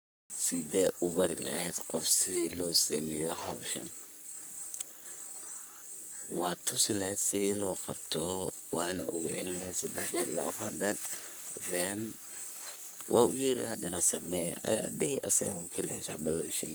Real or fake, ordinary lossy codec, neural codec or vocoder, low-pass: fake; none; codec, 44.1 kHz, 3.4 kbps, Pupu-Codec; none